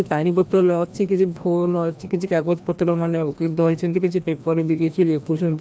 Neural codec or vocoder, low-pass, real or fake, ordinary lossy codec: codec, 16 kHz, 1 kbps, FreqCodec, larger model; none; fake; none